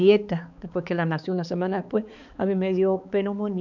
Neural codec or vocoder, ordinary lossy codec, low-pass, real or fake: codec, 16 kHz, 4 kbps, X-Codec, HuBERT features, trained on balanced general audio; none; 7.2 kHz; fake